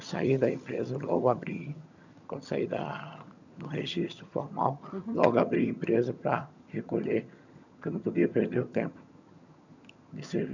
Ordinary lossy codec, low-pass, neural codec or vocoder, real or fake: none; 7.2 kHz; vocoder, 22.05 kHz, 80 mel bands, HiFi-GAN; fake